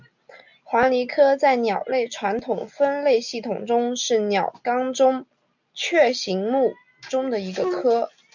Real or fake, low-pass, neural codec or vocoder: real; 7.2 kHz; none